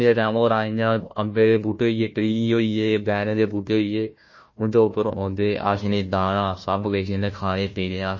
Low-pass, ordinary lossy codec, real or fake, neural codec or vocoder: 7.2 kHz; MP3, 32 kbps; fake; codec, 16 kHz, 1 kbps, FunCodec, trained on Chinese and English, 50 frames a second